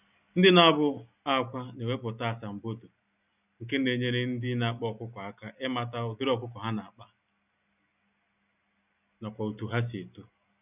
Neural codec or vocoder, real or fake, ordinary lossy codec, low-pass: none; real; none; 3.6 kHz